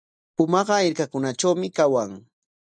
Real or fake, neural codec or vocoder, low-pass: real; none; 9.9 kHz